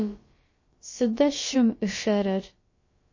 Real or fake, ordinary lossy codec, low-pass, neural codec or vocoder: fake; MP3, 32 kbps; 7.2 kHz; codec, 16 kHz, about 1 kbps, DyCAST, with the encoder's durations